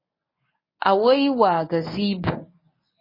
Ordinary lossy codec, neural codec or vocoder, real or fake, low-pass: MP3, 24 kbps; codec, 24 kHz, 0.9 kbps, WavTokenizer, medium speech release version 1; fake; 5.4 kHz